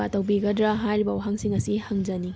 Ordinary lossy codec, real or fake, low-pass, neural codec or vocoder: none; real; none; none